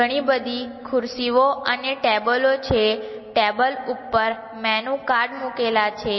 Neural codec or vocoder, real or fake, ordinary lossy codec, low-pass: none; real; MP3, 24 kbps; 7.2 kHz